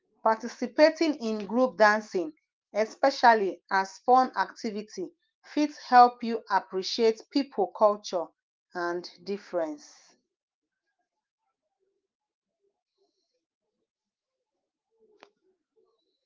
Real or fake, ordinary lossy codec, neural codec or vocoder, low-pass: fake; Opus, 32 kbps; autoencoder, 48 kHz, 128 numbers a frame, DAC-VAE, trained on Japanese speech; 7.2 kHz